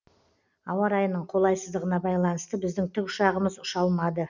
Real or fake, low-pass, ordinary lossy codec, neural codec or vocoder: real; 7.2 kHz; none; none